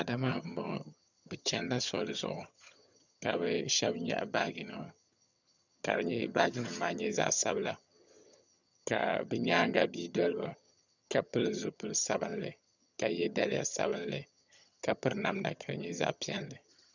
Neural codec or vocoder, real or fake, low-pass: vocoder, 22.05 kHz, 80 mel bands, HiFi-GAN; fake; 7.2 kHz